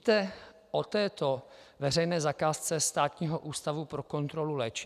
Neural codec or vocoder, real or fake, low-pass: none; real; 14.4 kHz